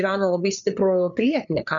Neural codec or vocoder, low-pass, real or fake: codec, 16 kHz, 8 kbps, FreqCodec, larger model; 7.2 kHz; fake